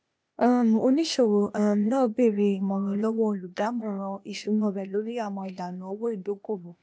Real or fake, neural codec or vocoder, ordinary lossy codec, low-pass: fake; codec, 16 kHz, 0.8 kbps, ZipCodec; none; none